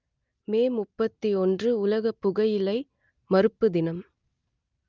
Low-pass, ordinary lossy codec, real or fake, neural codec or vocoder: 7.2 kHz; Opus, 24 kbps; real; none